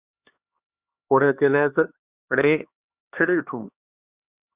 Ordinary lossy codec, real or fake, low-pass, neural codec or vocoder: Opus, 64 kbps; fake; 3.6 kHz; codec, 16 kHz, 2 kbps, X-Codec, HuBERT features, trained on LibriSpeech